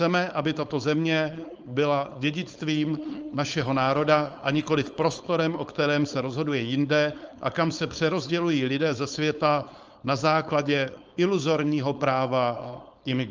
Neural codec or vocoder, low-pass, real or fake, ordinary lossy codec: codec, 16 kHz, 4.8 kbps, FACodec; 7.2 kHz; fake; Opus, 24 kbps